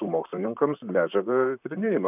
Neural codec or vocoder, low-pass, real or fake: vocoder, 44.1 kHz, 128 mel bands, Pupu-Vocoder; 3.6 kHz; fake